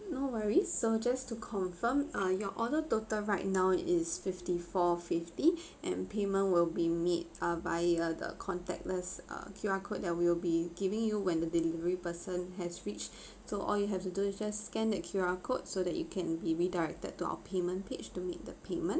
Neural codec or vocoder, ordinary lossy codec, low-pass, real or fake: none; none; none; real